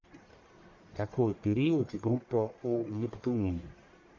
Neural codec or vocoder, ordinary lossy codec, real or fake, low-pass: codec, 44.1 kHz, 1.7 kbps, Pupu-Codec; MP3, 48 kbps; fake; 7.2 kHz